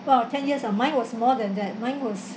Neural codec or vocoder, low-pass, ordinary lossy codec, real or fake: none; none; none; real